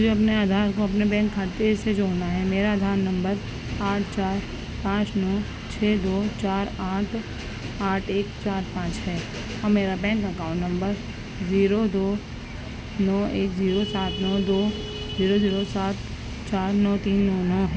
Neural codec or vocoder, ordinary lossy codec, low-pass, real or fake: none; none; none; real